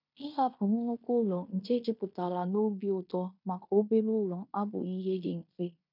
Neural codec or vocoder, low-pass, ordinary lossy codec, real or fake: codec, 16 kHz in and 24 kHz out, 0.9 kbps, LongCat-Audio-Codec, fine tuned four codebook decoder; 5.4 kHz; none; fake